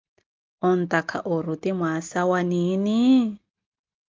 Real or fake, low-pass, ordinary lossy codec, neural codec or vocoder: real; 7.2 kHz; Opus, 32 kbps; none